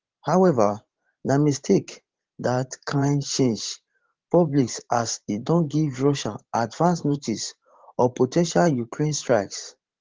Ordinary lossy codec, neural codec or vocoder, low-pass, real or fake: Opus, 16 kbps; vocoder, 44.1 kHz, 128 mel bands every 512 samples, BigVGAN v2; 7.2 kHz; fake